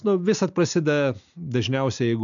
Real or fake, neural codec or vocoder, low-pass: real; none; 7.2 kHz